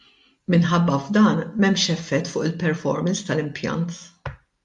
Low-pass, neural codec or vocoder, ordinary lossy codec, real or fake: 10.8 kHz; none; MP3, 64 kbps; real